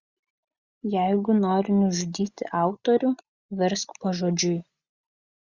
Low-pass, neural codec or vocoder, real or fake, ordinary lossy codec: 7.2 kHz; none; real; Opus, 64 kbps